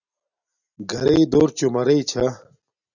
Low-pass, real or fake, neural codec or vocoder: 7.2 kHz; real; none